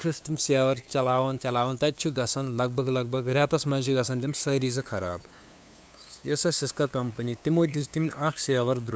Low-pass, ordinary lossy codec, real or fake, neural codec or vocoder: none; none; fake; codec, 16 kHz, 2 kbps, FunCodec, trained on LibriTTS, 25 frames a second